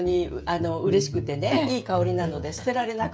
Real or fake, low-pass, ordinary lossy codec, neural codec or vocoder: fake; none; none; codec, 16 kHz, 16 kbps, FreqCodec, smaller model